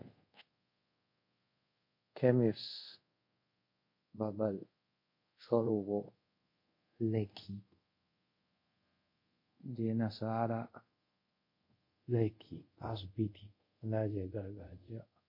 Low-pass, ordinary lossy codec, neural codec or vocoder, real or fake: 5.4 kHz; MP3, 32 kbps; codec, 24 kHz, 0.5 kbps, DualCodec; fake